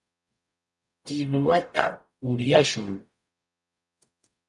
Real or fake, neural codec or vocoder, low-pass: fake; codec, 44.1 kHz, 0.9 kbps, DAC; 10.8 kHz